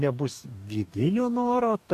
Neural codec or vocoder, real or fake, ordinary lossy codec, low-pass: codec, 44.1 kHz, 2.6 kbps, DAC; fake; AAC, 96 kbps; 14.4 kHz